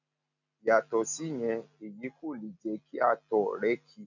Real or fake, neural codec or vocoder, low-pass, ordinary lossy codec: real; none; 7.2 kHz; none